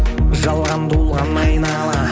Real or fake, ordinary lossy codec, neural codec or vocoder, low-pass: real; none; none; none